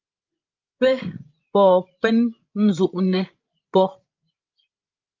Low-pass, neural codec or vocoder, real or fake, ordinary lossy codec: 7.2 kHz; codec, 16 kHz, 16 kbps, FreqCodec, larger model; fake; Opus, 24 kbps